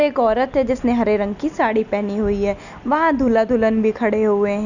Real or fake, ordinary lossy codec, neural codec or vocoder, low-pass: real; none; none; 7.2 kHz